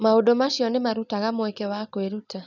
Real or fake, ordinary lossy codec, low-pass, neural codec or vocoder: real; none; 7.2 kHz; none